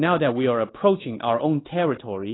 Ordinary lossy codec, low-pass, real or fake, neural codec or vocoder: AAC, 16 kbps; 7.2 kHz; fake; codec, 24 kHz, 1.2 kbps, DualCodec